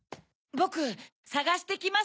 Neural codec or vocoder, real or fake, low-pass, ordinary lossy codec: none; real; none; none